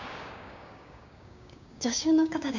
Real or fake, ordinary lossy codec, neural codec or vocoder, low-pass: fake; none; codec, 16 kHz, 6 kbps, DAC; 7.2 kHz